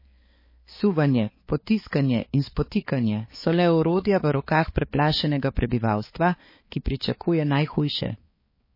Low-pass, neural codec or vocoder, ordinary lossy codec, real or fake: 5.4 kHz; codec, 16 kHz, 4 kbps, X-Codec, HuBERT features, trained on balanced general audio; MP3, 24 kbps; fake